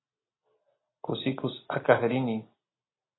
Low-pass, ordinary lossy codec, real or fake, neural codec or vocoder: 7.2 kHz; AAC, 16 kbps; real; none